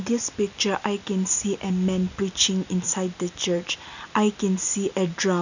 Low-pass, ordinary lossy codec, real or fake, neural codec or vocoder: 7.2 kHz; none; real; none